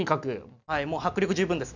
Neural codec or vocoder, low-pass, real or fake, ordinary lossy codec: none; 7.2 kHz; real; none